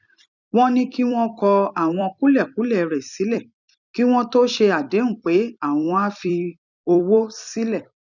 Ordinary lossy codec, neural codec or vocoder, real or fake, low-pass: none; vocoder, 44.1 kHz, 128 mel bands every 256 samples, BigVGAN v2; fake; 7.2 kHz